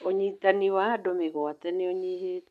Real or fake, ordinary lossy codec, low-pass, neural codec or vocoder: real; none; 14.4 kHz; none